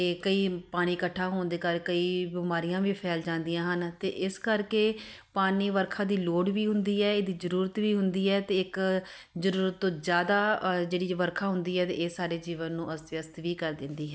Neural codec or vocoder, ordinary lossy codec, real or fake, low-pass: none; none; real; none